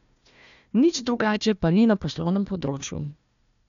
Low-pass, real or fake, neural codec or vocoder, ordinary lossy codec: 7.2 kHz; fake; codec, 16 kHz, 1 kbps, FunCodec, trained on Chinese and English, 50 frames a second; none